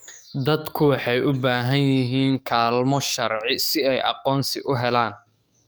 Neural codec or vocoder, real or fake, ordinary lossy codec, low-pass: codec, 44.1 kHz, 7.8 kbps, DAC; fake; none; none